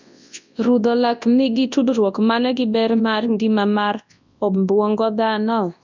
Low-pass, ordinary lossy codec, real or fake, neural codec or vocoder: 7.2 kHz; MP3, 64 kbps; fake; codec, 24 kHz, 0.9 kbps, WavTokenizer, large speech release